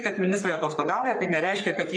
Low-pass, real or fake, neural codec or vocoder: 9.9 kHz; fake; codec, 44.1 kHz, 3.4 kbps, Pupu-Codec